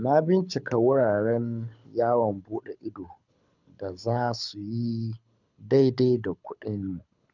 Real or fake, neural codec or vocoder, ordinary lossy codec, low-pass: fake; codec, 24 kHz, 6 kbps, HILCodec; none; 7.2 kHz